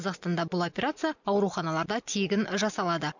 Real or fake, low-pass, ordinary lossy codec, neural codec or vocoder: real; 7.2 kHz; none; none